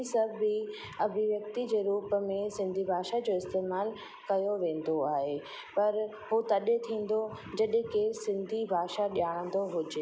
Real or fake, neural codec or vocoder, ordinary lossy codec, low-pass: real; none; none; none